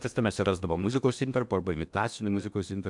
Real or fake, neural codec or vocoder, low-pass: fake; codec, 16 kHz in and 24 kHz out, 0.8 kbps, FocalCodec, streaming, 65536 codes; 10.8 kHz